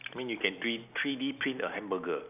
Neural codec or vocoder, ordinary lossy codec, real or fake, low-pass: none; none; real; 3.6 kHz